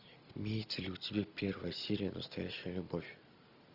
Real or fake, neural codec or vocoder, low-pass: real; none; 5.4 kHz